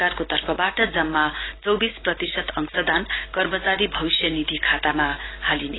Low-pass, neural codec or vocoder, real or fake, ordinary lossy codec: 7.2 kHz; none; real; AAC, 16 kbps